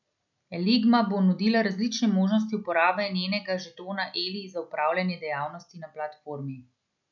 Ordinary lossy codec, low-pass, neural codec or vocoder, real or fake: none; 7.2 kHz; none; real